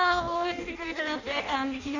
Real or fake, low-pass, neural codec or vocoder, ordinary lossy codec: fake; 7.2 kHz; codec, 16 kHz in and 24 kHz out, 0.6 kbps, FireRedTTS-2 codec; none